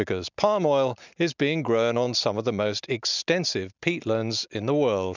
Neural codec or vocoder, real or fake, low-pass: none; real; 7.2 kHz